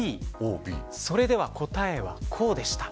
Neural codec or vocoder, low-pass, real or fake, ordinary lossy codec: none; none; real; none